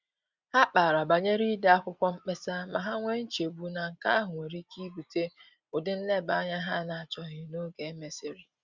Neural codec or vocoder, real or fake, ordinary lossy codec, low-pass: none; real; Opus, 64 kbps; 7.2 kHz